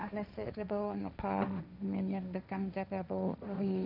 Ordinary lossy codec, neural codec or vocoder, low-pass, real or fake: none; codec, 16 kHz, 1.1 kbps, Voila-Tokenizer; 5.4 kHz; fake